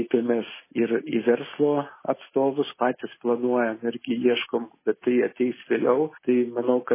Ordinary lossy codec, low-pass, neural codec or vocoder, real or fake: MP3, 16 kbps; 3.6 kHz; none; real